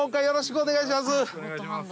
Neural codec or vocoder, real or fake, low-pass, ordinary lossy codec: none; real; none; none